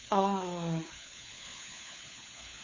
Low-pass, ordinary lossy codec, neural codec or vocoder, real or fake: 7.2 kHz; MP3, 32 kbps; codec, 16 kHz, 4 kbps, FunCodec, trained on LibriTTS, 50 frames a second; fake